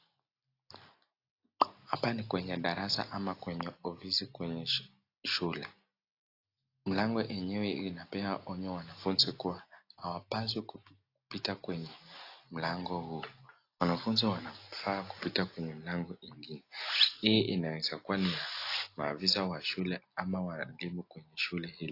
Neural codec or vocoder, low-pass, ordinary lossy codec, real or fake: none; 5.4 kHz; AAC, 32 kbps; real